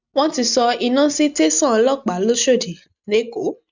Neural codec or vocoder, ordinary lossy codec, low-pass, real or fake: none; none; 7.2 kHz; real